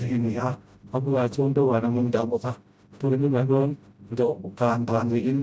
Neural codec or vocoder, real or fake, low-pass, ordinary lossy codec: codec, 16 kHz, 0.5 kbps, FreqCodec, smaller model; fake; none; none